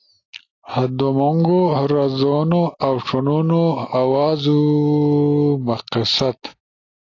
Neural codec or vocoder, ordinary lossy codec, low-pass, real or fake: none; AAC, 32 kbps; 7.2 kHz; real